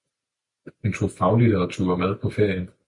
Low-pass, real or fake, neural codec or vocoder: 10.8 kHz; real; none